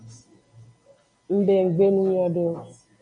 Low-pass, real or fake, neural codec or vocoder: 9.9 kHz; real; none